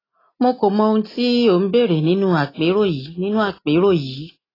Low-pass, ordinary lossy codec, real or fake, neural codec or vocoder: 5.4 kHz; AAC, 24 kbps; real; none